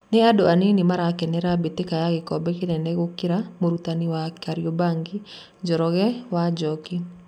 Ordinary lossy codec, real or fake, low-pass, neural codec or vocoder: none; real; 19.8 kHz; none